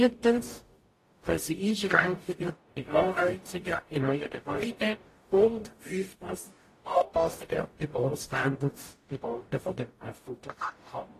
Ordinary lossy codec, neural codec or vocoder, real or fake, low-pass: AAC, 48 kbps; codec, 44.1 kHz, 0.9 kbps, DAC; fake; 14.4 kHz